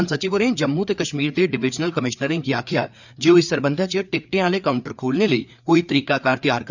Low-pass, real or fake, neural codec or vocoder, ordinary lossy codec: 7.2 kHz; fake; codec, 16 kHz, 4 kbps, FreqCodec, larger model; none